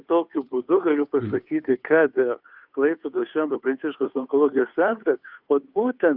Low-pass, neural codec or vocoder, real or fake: 5.4 kHz; codec, 16 kHz, 2 kbps, FunCodec, trained on Chinese and English, 25 frames a second; fake